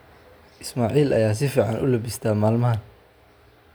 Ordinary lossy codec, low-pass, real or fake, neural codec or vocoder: none; none; real; none